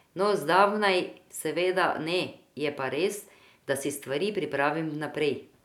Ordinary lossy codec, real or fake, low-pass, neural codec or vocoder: none; real; 19.8 kHz; none